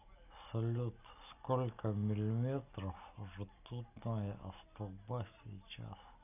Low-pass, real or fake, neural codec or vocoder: 3.6 kHz; real; none